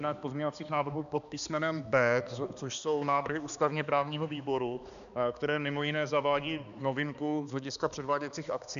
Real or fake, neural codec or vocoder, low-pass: fake; codec, 16 kHz, 2 kbps, X-Codec, HuBERT features, trained on balanced general audio; 7.2 kHz